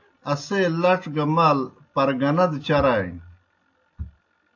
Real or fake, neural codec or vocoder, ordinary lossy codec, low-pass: real; none; AAC, 48 kbps; 7.2 kHz